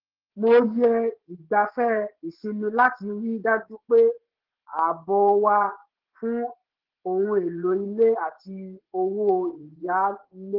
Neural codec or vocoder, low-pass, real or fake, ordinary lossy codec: vocoder, 24 kHz, 100 mel bands, Vocos; 5.4 kHz; fake; Opus, 16 kbps